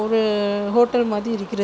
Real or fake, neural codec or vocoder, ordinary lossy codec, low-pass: real; none; none; none